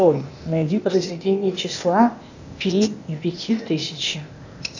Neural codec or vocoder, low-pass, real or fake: codec, 16 kHz, 0.8 kbps, ZipCodec; 7.2 kHz; fake